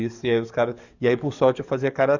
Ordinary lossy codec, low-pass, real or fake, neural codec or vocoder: none; 7.2 kHz; fake; codec, 44.1 kHz, 7.8 kbps, DAC